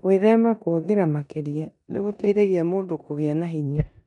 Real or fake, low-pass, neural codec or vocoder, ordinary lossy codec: fake; 10.8 kHz; codec, 16 kHz in and 24 kHz out, 0.9 kbps, LongCat-Audio-Codec, four codebook decoder; none